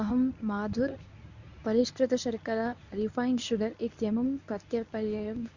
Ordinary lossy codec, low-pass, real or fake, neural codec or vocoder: none; 7.2 kHz; fake; codec, 24 kHz, 0.9 kbps, WavTokenizer, medium speech release version 1